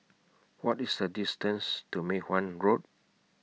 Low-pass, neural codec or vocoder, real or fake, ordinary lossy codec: none; none; real; none